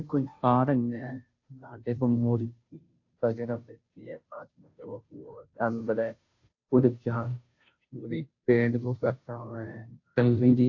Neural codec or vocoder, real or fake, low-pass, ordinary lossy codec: codec, 16 kHz, 0.5 kbps, FunCodec, trained on Chinese and English, 25 frames a second; fake; 7.2 kHz; AAC, 48 kbps